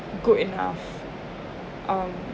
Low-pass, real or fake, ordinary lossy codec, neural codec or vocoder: none; real; none; none